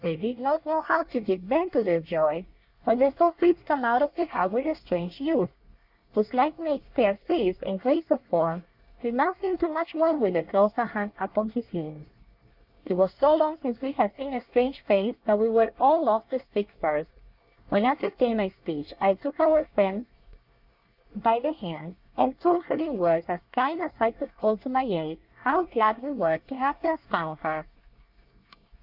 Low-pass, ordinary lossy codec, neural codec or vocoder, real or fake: 5.4 kHz; Opus, 64 kbps; codec, 24 kHz, 1 kbps, SNAC; fake